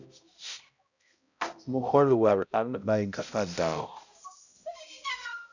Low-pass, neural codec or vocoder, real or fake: 7.2 kHz; codec, 16 kHz, 0.5 kbps, X-Codec, HuBERT features, trained on balanced general audio; fake